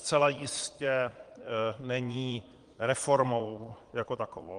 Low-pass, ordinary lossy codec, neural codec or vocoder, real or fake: 9.9 kHz; Opus, 24 kbps; vocoder, 22.05 kHz, 80 mel bands, Vocos; fake